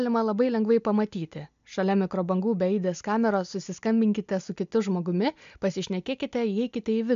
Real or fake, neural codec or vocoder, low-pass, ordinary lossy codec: real; none; 7.2 kHz; MP3, 64 kbps